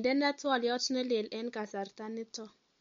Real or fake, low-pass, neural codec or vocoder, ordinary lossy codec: real; 7.2 kHz; none; MP3, 48 kbps